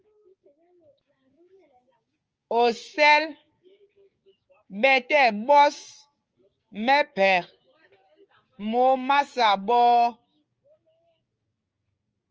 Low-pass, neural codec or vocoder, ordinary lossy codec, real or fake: 7.2 kHz; codec, 16 kHz, 6 kbps, DAC; Opus, 24 kbps; fake